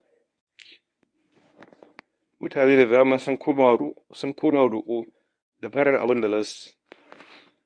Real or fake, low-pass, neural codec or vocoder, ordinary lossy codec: fake; 9.9 kHz; codec, 24 kHz, 0.9 kbps, WavTokenizer, medium speech release version 1; none